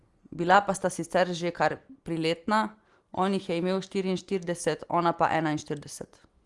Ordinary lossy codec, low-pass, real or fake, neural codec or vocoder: Opus, 24 kbps; 10.8 kHz; real; none